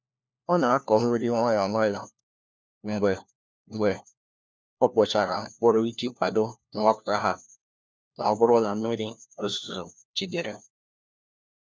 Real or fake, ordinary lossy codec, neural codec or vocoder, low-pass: fake; none; codec, 16 kHz, 1 kbps, FunCodec, trained on LibriTTS, 50 frames a second; none